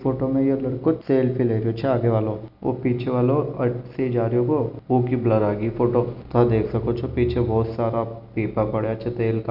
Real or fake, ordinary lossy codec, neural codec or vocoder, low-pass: real; none; none; 5.4 kHz